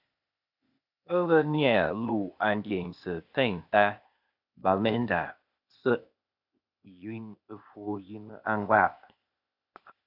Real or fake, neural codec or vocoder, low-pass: fake; codec, 16 kHz, 0.8 kbps, ZipCodec; 5.4 kHz